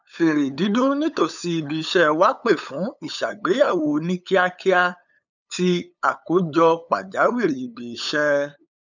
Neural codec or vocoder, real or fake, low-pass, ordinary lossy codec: codec, 16 kHz, 8 kbps, FunCodec, trained on LibriTTS, 25 frames a second; fake; 7.2 kHz; none